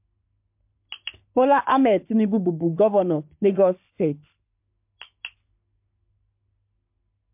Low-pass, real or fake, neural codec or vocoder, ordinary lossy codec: 3.6 kHz; fake; codec, 44.1 kHz, 3.4 kbps, Pupu-Codec; MP3, 32 kbps